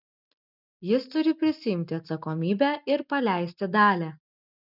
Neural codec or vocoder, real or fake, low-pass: none; real; 5.4 kHz